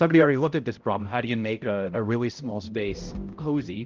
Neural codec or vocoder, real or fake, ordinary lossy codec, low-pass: codec, 16 kHz, 0.5 kbps, X-Codec, HuBERT features, trained on balanced general audio; fake; Opus, 32 kbps; 7.2 kHz